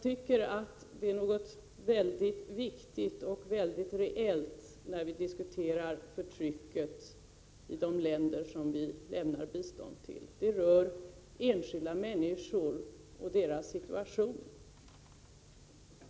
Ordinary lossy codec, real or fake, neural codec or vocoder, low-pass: none; real; none; none